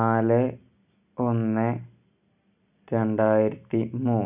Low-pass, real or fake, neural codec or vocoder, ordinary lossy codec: 3.6 kHz; real; none; none